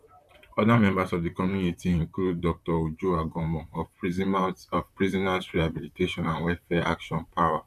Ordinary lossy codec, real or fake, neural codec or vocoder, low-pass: none; fake; vocoder, 44.1 kHz, 128 mel bands, Pupu-Vocoder; 14.4 kHz